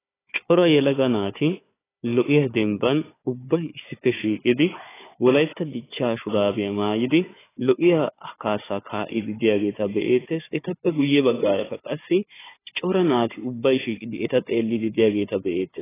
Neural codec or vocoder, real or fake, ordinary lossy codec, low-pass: codec, 16 kHz, 4 kbps, FunCodec, trained on Chinese and English, 50 frames a second; fake; AAC, 16 kbps; 3.6 kHz